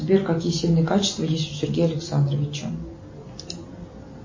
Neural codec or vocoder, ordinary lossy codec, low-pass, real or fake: none; MP3, 32 kbps; 7.2 kHz; real